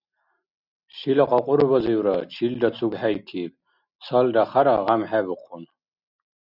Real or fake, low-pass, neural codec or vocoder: real; 5.4 kHz; none